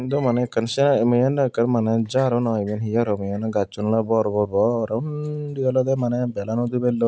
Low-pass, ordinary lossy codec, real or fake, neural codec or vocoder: none; none; real; none